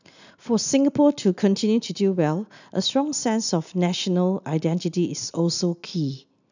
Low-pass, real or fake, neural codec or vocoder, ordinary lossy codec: 7.2 kHz; real; none; none